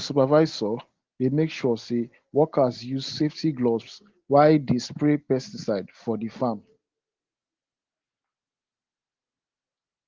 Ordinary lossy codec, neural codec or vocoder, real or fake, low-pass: Opus, 16 kbps; none; real; 7.2 kHz